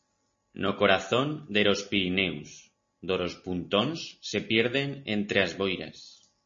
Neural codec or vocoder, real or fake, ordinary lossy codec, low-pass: none; real; MP3, 32 kbps; 7.2 kHz